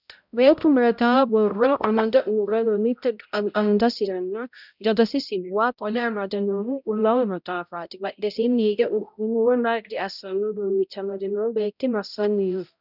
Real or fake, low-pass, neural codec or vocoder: fake; 5.4 kHz; codec, 16 kHz, 0.5 kbps, X-Codec, HuBERT features, trained on balanced general audio